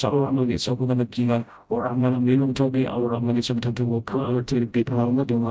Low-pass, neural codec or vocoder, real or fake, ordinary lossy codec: none; codec, 16 kHz, 0.5 kbps, FreqCodec, smaller model; fake; none